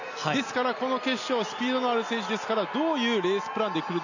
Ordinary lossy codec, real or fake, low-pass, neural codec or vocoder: none; real; 7.2 kHz; none